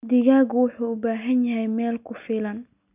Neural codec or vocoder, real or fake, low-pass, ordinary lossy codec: none; real; 3.6 kHz; none